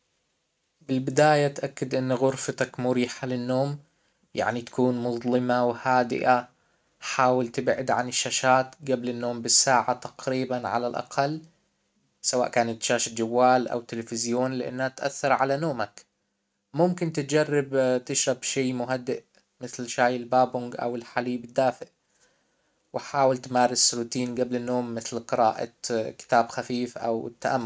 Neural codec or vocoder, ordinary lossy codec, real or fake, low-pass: none; none; real; none